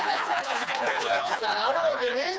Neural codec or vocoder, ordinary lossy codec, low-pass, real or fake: codec, 16 kHz, 2 kbps, FreqCodec, smaller model; none; none; fake